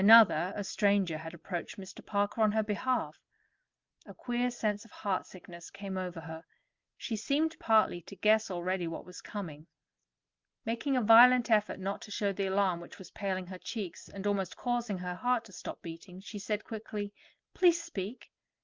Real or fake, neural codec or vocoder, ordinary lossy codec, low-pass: real; none; Opus, 24 kbps; 7.2 kHz